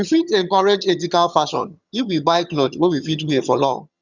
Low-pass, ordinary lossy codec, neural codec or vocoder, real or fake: 7.2 kHz; Opus, 64 kbps; vocoder, 22.05 kHz, 80 mel bands, HiFi-GAN; fake